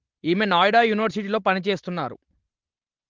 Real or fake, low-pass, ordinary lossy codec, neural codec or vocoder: real; 7.2 kHz; Opus, 16 kbps; none